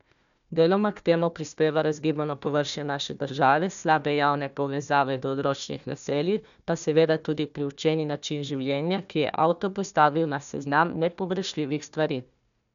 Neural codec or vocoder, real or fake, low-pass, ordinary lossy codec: codec, 16 kHz, 1 kbps, FunCodec, trained on Chinese and English, 50 frames a second; fake; 7.2 kHz; none